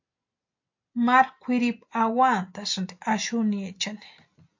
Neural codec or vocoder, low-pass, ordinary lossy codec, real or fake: none; 7.2 kHz; MP3, 48 kbps; real